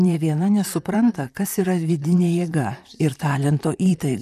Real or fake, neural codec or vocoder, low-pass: fake; vocoder, 44.1 kHz, 128 mel bands, Pupu-Vocoder; 14.4 kHz